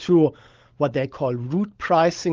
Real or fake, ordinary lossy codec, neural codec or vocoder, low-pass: fake; Opus, 16 kbps; codec, 16 kHz, 16 kbps, FunCodec, trained on LibriTTS, 50 frames a second; 7.2 kHz